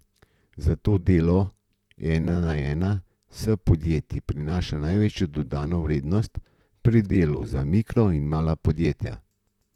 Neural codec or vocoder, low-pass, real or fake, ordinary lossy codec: vocoder, 44.1 kHz, 128 mel bands, Pupu-Vocoder; 19.8 kHz; fake; none